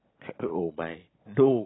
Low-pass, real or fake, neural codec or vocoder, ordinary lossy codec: 7.2 kHz; real; none; AAC, 16 kbps